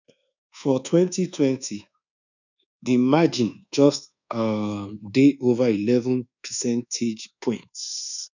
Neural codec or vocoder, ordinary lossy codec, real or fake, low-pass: codec, 24 kHz, 1.2 kbps, DualCodec; none; fake; 7.2 kHz